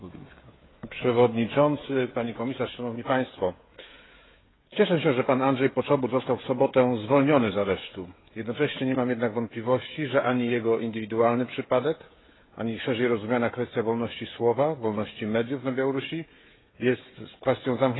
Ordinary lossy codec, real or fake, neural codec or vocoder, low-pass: AAC, 16 kbps; fake; codec, 16 kHz, 16 kbps, FreqCodec, smaller model; 7.2 kHz